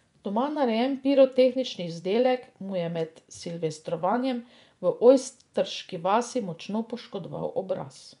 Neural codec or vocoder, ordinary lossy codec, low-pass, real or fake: vocoder, 24 kHz, 100 mel bands, Vocos; none; 10.8 kHz; fake